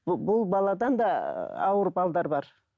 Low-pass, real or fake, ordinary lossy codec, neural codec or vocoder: none; real; none; none